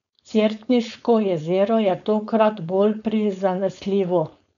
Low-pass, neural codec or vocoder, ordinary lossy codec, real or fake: 7.2 kHz; codec, 16 kHz, 4.8 kbps, FACodec; none; fake